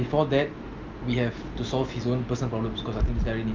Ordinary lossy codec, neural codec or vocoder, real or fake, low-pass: Opus, 24 kbps; none; real; 7.2 kHz